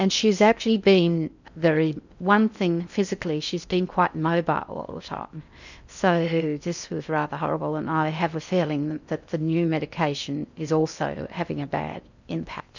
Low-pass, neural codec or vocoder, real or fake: 7.2 kHz; codec, 16 kHz in and 24 kHz out, 0.6 kbps, FocalCodec, streaming, 2048 codes; fake